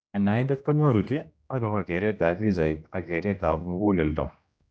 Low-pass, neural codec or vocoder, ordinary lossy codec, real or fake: none; codec, 16 kHz, 1 kbps, X-Codec, HuBERT features, trained on general audio; none; fake